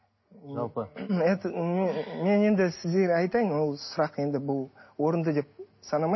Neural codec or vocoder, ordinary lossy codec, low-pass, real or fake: none; MP3, 24 kbps; 7.2 kHz; real